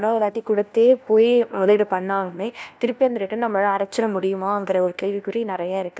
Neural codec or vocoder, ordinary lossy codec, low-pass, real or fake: codec, 16 kHz, 1 kbps, FunCodec, trained on LibriTTS, 50 frames a second; none; none; fake